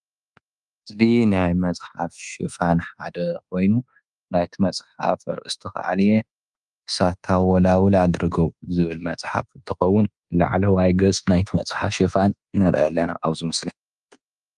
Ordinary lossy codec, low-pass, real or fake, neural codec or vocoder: Opus, 32 kbps; 10.8 kHz; fake; codec, 24 kHz, 1.2 kbps, DualCodec